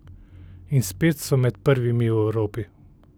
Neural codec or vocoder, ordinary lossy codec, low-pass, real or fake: none; none; none; real